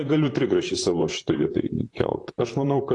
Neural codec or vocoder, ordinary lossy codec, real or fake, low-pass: vocoder, 44.1 kHz, 128 mel bands, Pupu-Vocoder; AAC, 48 kbps; fake; 10.8 kHz